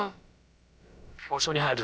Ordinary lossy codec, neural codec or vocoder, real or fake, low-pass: none; codec, 16 kHz, about 1 kbps, DyCAST, with the encoder's durations; fake; none